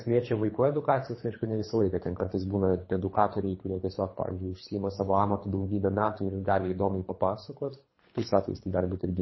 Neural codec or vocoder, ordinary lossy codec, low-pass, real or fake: codec, 24 kHz, 3 kbps, HILCodec; MP3, 24 kbps; 7.2 kHz; fake